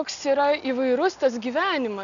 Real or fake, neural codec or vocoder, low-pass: real; none; 7.2 kHz